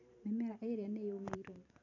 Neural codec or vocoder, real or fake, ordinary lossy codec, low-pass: vocoder, 44.1 kHz, 128 mel bands every 512 samples, BigVGAN v2; fake; none; 7.2 kHz